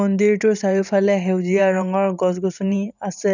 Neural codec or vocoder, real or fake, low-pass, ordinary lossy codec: vocoder, 44.1 kHz, 128 mel bands every 512 samples, BigVGAN v2; fake; 7.2 kHz; none